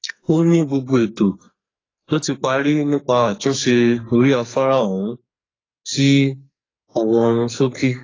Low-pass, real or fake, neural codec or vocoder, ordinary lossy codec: 7.2 kHz; fake; codec, 44.1 kHz, 2.6 kbps, SNAC; AAC, 32 kbps